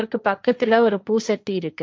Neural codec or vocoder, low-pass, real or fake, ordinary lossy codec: codec, 16 kHz, 1.1 kbps, Voila-Tokenizer; none; fake; none